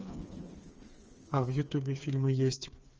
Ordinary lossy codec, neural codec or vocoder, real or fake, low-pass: Opus, 24 kbps; codec, 16 kHz, 4 kbps, FreqCodec, smaller model; fake; 7.2 kHz